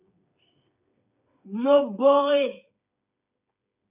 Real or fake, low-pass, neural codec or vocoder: fake; 3.6 kHz; codec, 16 kHz, 8 kbps, FreqCodec, smaller model